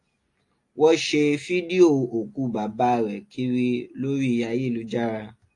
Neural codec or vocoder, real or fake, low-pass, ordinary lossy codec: none; real; 10.8 kHz; AAC, 48 kbps